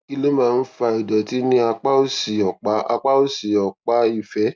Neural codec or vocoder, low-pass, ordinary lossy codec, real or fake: none; none; none; real